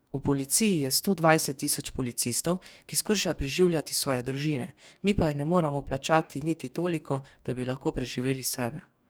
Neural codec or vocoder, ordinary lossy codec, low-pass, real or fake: codec, 44.1 kHz, 2.6 kbps, DAC; none; none; fake